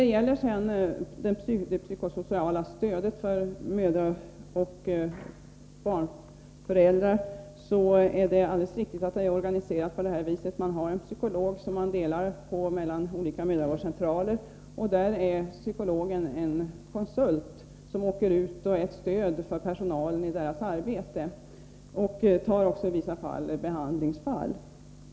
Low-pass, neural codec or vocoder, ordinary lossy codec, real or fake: none; none; none; real